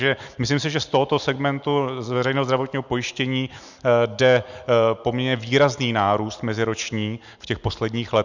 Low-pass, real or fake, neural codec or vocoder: 7.2 kHz; real; none